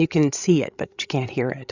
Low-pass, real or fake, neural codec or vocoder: 7.2 kHz; fake; codec, 16 kHz, 8 kbps, FreqCodec, larger model